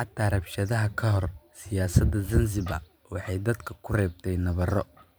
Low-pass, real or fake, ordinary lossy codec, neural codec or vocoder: none; fake; none; vocoder, 44.1 kHz, 128 mel bands every 512 samples, BigVGAN v2